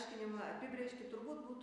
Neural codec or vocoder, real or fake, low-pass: none; real; 10.8 kHz